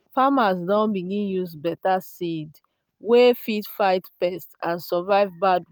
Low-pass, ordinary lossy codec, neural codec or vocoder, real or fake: none; none; none; real